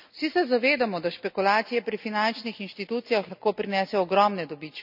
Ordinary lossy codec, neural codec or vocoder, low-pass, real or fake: none; none; 5.4 kHz; real